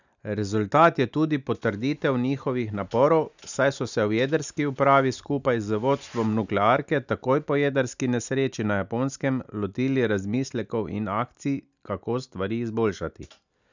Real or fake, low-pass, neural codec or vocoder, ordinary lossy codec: real; 7.2 kHz; none; none